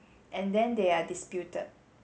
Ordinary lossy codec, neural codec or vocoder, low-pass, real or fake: none; none; none; real